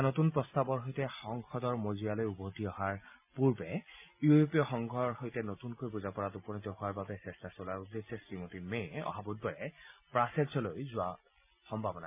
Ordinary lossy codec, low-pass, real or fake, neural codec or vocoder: MP3, 32 kbps; 3.6 kHz; real; none